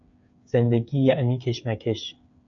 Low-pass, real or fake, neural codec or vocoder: 7.2 kHz; fake; codec, 16 kHz, 8 kbps, FreqCodec, smaller model